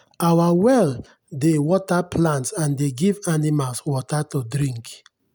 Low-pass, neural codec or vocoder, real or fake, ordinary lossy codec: none; none; real; none